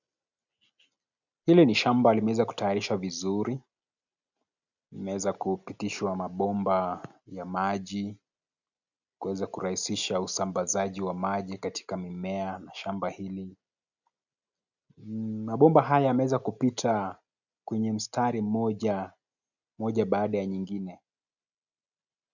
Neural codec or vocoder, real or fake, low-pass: none; real; 7.2 kHz